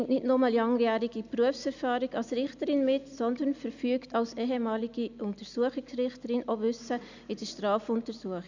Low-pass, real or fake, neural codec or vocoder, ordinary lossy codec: 7.2 kHz; real; none; none